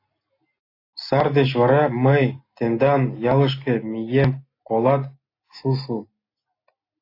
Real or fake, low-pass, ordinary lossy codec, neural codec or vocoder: real; 5.4 kHz; AAC, 32 kbps; none